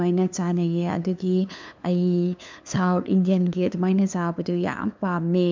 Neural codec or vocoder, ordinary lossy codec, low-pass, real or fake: codec, 16 kHz, 2 kbps, FunCodec, trained on LibriTTS, 25 frames a second; MP3, 64 kbps; 7.2 kHz; fake